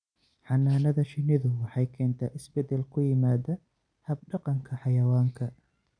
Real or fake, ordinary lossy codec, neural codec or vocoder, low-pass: real; none; none; 9.9 kHz